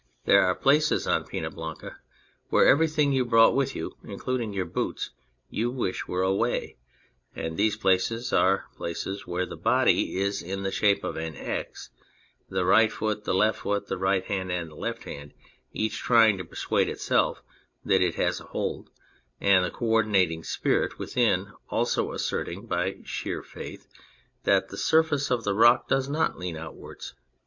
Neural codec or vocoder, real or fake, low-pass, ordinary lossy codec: none; real; 7.2 kHz; MP3, 48 kbps